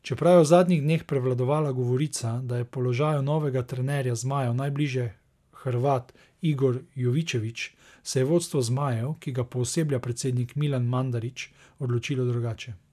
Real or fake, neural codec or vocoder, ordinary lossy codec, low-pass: real; none; none; 14.4 kHz